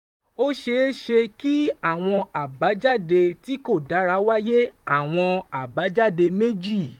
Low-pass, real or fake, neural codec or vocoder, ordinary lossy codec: 19.8 kHz; fake; vocoder, 44.1 kHz, 128 mel bands, Pupu-Vocoder; none